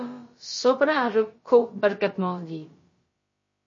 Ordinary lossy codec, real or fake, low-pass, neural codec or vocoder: MP3, 32 kbps; fake; 7.2 kHz; codec, 16 kHz, about 1 kbps, DyCAST, with the encoder's durations